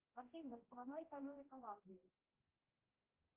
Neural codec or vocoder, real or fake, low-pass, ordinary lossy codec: codec, 16 kHz, 0.5 kbps, X-Codec, HuBERT features, trained on general audio; fake; 3.6 kHz; Opus, 32 kbps